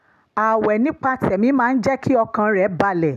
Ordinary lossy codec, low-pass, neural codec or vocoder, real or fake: none; 14.4 kHz; none; real